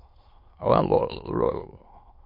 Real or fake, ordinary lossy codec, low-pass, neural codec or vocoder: fake; MP3, 32 kbps; 5.4 kHz; autoencoder, 22.05 kHz, a latent of 192 numbers a frame, VITS, trained on many speakers